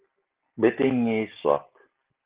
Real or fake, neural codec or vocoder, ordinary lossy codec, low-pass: real; none; Opus, 16 kbps; 3.6 kHz